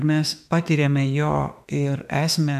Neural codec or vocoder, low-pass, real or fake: autoencoder, 48 kHz, 32 numbers a frame, DAC-VAE, trained on Japanese speech; 14.4 kHz; fake